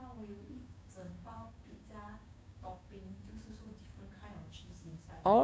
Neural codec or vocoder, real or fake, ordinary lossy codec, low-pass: codec, 16 kHz, 6 kbps, DAC; fake; none; none